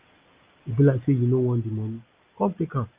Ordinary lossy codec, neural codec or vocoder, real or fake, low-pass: Opus, 24 kbps; none; real; 3.6 kHz